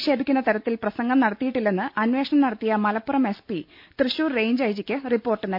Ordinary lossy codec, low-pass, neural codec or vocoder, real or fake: MP3, 48 kbps; 5.4 kHz; none; real